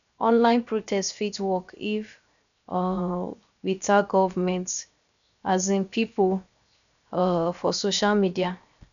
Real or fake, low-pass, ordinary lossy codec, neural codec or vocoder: fake; 7.2 kHz; none; codec, 16 kHz, 0.7 kbps, FocalCodec